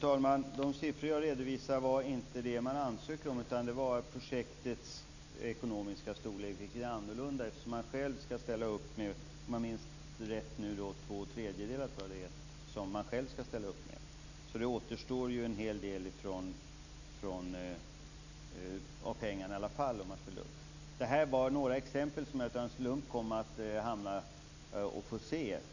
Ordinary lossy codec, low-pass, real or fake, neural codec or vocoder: none; 7.2 kHz; real; none